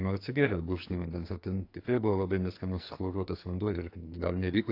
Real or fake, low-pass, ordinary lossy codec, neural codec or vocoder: fake; 5.4 kHz; AAC, 32 kbps; codec, 44.1 kHz, 2.6 kbps, SNAC